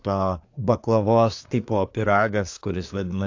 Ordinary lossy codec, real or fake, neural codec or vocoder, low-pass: AAC, 48 kbps; fake; codec, 24 kHz, 1 kbps, SNAC; 7.2 kHz